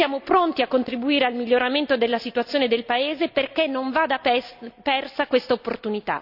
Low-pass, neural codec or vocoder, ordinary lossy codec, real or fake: 5.4 kHz; none; none; real